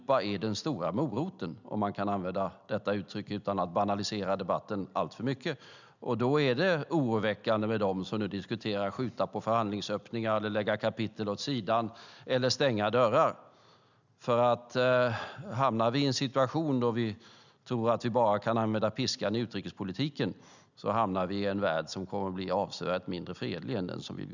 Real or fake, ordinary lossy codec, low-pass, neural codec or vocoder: real; none; 7.2 kHz; none